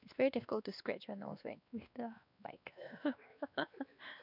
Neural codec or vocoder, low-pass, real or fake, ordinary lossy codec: codec, 16 kHz, 2 kbps, X-Codec, WavLM features, trained on Multilingual LibriSpeech; 5.4 kHz; fake; none